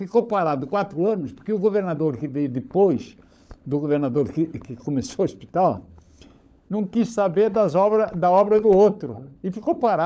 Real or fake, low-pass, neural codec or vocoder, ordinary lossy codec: fake; none; codec, 16 kHz, 16 kbps, FunCodec, trained on LibriTTS, 50 frames a second; none